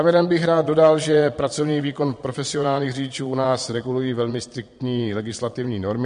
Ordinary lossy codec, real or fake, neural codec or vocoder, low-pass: MP3, 48 kbps; fake; vocoder, 22.05 kHz, 80 mel bands, Vocos; 9.9 kHz